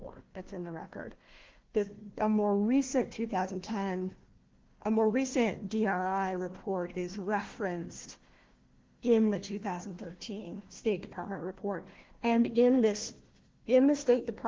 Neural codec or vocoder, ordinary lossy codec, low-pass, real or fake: codec, 16 kHz, 1 kbps, FunCodec, trained on Chinese and English, 50 frames a second; Opus, 16 kbps; 7.2 kHz; fake